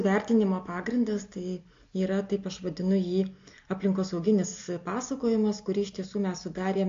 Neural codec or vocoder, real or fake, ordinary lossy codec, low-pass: none; real; AAC, 48 kbps; 7.2 kHz